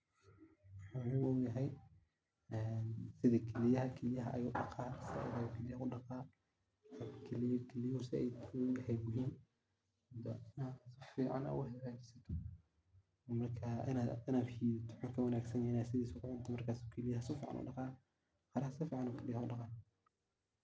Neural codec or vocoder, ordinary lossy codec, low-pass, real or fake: none; none; none; real